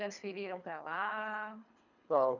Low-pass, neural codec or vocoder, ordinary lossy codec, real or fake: 7.2 kHz; codec, 24 kHz, 3 kbps, HILCodec; none; fake